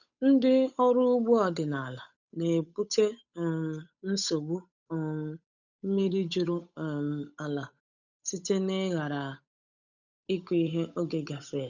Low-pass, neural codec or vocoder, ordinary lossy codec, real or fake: 7.2 kHz; codec, 16 kHz, 8 kbps, FunCodec, trained on Chinese and English, 25 frames a second; none; fake